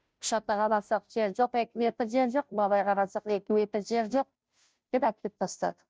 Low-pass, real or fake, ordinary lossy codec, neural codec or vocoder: none; fake; none; codec, 16 kHz, 0.5 kbps, FunCodec, trained on Chinese and English, 25 frames a second